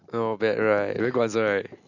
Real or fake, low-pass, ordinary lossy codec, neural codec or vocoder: real; 7.2 kHz; none; none